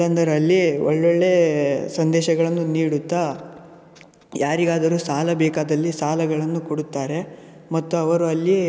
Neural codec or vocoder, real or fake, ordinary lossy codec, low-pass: none; real; none; none